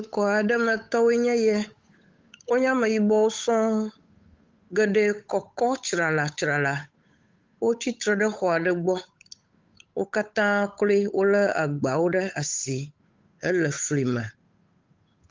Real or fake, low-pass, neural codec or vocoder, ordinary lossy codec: fake; 7.2 kHz; codec, 16 kHz, 8 kbps, FunCodec, trained on Chinese and English, 25 frames a second; Opus, 32 kbps